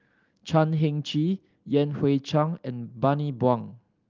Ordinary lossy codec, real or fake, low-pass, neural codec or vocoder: Opus, 32 kbps; real; 7.2 kHz; none